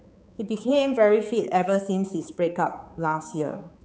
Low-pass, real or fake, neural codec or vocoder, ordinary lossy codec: none; fake; codec, 16 kHz, 4 kbps, X-Codec, HuBERT features, trained on balanced general audio; none